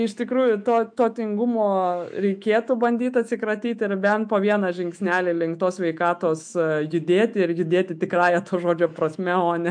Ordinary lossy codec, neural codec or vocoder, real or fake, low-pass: MP3, 64 kbps; autoencoder, 48 kHz, 128 numbers a frame, DAC-VAE, trained on Japanese speech; fake; 9.9 kHz